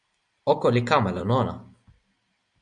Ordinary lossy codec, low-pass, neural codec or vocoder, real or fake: Opus, 64 kbps; 9.9 kHz; none; real